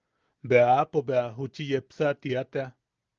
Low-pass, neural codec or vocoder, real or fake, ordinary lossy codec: 7.2 kHz; none; real; Opus, 24 kbps